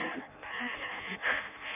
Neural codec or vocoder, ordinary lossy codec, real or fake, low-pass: codec, 16 kHz in and 24 kHz out, 0.6 kbps, FireRedTTS-2 codec; none; fake; 3.6 kHz